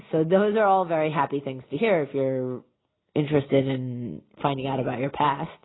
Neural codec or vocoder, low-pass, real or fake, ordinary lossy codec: none; 7.2 kHz; real; AAC, 16 kbps